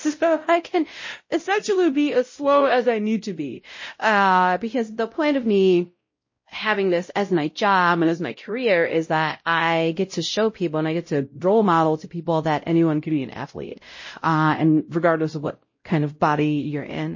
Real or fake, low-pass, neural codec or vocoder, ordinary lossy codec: fake; 7.2 kHz; codec, 16 kHz, 0.5 kbps, X-Codec, WavLM features, trained on Multilingual LibriSpeech; MP3, 32 kbps